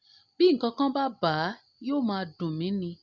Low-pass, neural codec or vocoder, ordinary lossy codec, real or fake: 7.2 kHz; none; Opus, 64 kbps; real